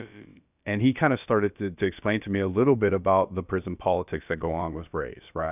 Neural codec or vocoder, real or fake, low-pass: codec, 16 kHz, about 1 kbps, DyCAST, with the encoder's durations; fake; 3.6 kHz